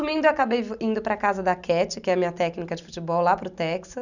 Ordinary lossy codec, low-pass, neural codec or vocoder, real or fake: none; 7.2 kHz; none; real